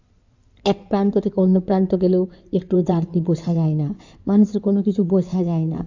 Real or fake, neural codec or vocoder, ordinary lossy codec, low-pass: fake; codec, 16 kHz in and 24 kHz out, 2.2 kbps, FireRedTTS-2 codec; AAC, 48 kbps; 7.2 kHz